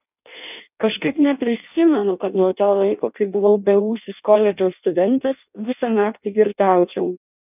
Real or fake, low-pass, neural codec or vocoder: fake; 3.6 kHz; codec, 16 kHz in and 24 kHz out, 0.6 kbps, FireRedTTS-2 codec